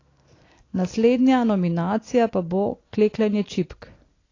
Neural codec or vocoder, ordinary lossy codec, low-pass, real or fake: none; AAC, 32 kbps; 7.2 kHz; real